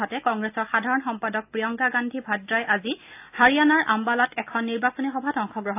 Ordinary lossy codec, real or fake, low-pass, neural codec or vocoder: AAC, 32 kbps; real; 3.6 kHz; none